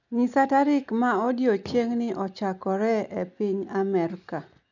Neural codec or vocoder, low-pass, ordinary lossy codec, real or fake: none; 7.2 kHz; none; real